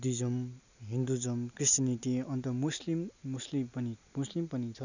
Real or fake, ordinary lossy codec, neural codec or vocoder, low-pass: real; none; none; 7.2 kHz